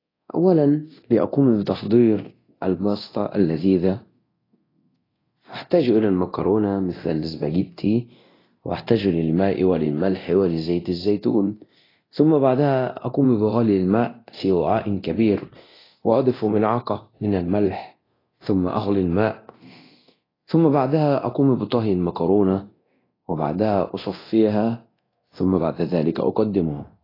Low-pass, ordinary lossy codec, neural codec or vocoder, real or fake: 5.4 kHz; AAC, 24 kbps; codec, 24 kHz, 0.9 kbps, DualCodec; fake